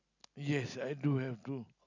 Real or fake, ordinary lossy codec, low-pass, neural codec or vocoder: real; none; 7.2 kHz; none